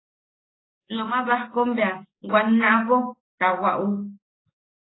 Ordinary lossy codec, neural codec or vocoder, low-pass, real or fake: AAC, 16 kbps; codec, 44.1 kHz, 7.8 kbps, DAC; 7.2 kHz; fake